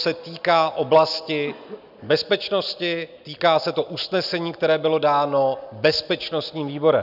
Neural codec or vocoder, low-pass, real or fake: none; 5.4 kHz; real